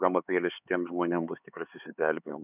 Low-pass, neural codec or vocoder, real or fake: 3.6 kHz; codec, 16 kHz, 4 kbps, X-Codec, HuBERT features, trained on LibriSpeech; fake